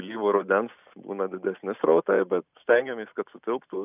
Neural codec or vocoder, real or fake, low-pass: none; real; 3.6 kHz